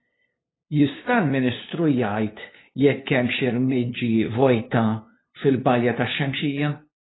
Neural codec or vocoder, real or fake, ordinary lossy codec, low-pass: codec, 16 kHz, 2 kbps, FunCodec, trained on LibriTTS, 25 frames a second; fake; AAC, 16 kbps; 7.2 kHz